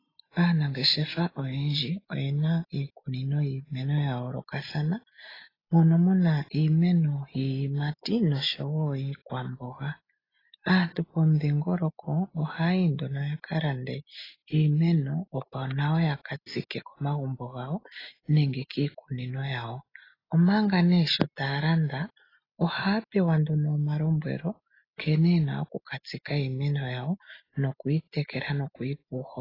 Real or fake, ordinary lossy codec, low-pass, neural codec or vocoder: real; AAC, 24 kbps; 5.4 kHz; none